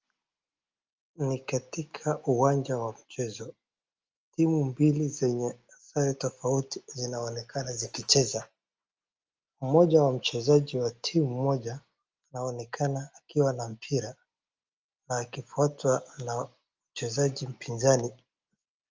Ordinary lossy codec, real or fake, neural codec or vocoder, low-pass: Opus, 24 kbps; real; none; 7.2 kHz